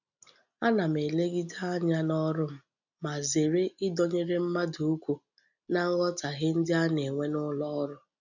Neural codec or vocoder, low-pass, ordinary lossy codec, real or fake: none; 7.2 kHz; none; real